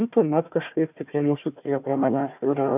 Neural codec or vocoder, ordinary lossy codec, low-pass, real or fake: codec, 16 kHz, 1 kbps, FunCodec, trained on Chinese and English, 50 frames a second; AAC, 32 kbps; 3.6 kHz; fake